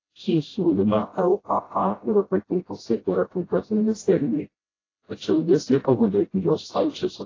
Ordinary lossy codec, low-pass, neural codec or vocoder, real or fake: AAC, 32 kbps; 7.2 kHz; codec, 16 kHz, 0.5 kbps, FreqCodec, smaller model; fake